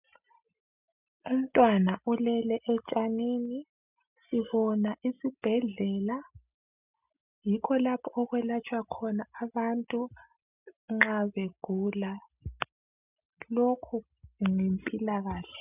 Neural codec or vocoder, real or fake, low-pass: none; real; 3.6 kHz